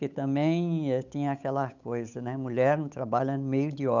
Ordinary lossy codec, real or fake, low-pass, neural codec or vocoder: none; fake; 7.2 kHz; codec, 16 kHz, 8 kbps, FunCodec, trained on Chinese and English, 25 frames a second